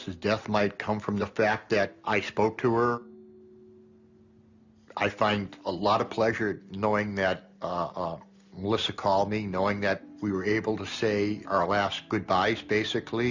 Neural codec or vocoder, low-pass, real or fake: none; 7.2 kHz; real